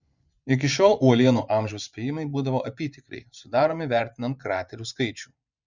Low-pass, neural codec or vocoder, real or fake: 7.2 kHz; vocoder, 24 kHz, 100 mel bands, Vocos; fake